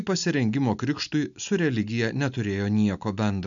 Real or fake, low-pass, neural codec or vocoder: real; 7.2 kHz; none